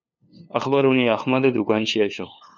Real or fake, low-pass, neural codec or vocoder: fake; 7.2 kHz; codec, 16 kHz, 2 kbps, FunCodec, trained on LibriTTS, 25 frames a second